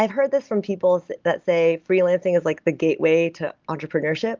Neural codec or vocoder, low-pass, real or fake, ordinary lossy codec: none; 7.2 kHz; real; Opus, 32 kbps